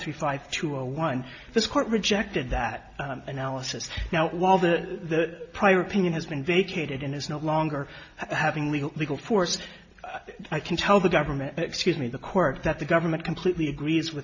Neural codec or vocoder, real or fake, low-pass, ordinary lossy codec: none; real; 7.2 kHz; AAC, 48 kbps